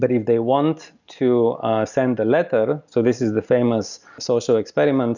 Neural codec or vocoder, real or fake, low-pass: none; real; 7.2 kHz